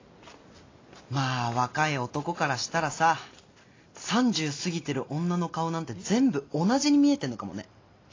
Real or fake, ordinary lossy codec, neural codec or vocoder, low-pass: real; AAC, 32 kbps; none; 7.2 kHz